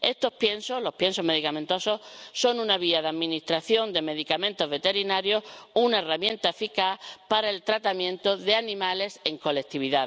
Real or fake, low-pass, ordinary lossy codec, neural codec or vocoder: real; none; none; none